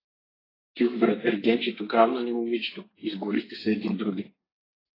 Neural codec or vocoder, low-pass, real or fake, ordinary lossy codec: codec, 32 kHz, 1.9 kbps, SNAC; 5.4 kHz; fake; AAC, 24 kbps